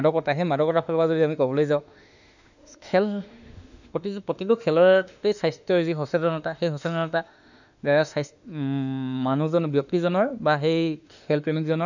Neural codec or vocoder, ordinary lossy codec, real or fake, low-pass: autoencoder, 48 kHz, 32 numbers a frame, DAC-VAE, trained on Japanese speech; none; fake; 7.2 kHz